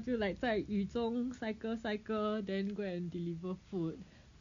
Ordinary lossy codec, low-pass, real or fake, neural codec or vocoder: MP3, 64 kbps; 7.2 kHz; real; none